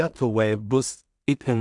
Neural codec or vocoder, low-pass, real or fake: codec, 16 kHz in and 24 kHz out, 0.4 kbps, LongCat-Audio-Codec, two codebook decoder; 10.8 kHz; fake